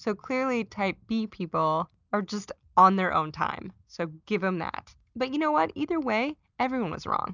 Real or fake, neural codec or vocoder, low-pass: real; none; 7.2 kHz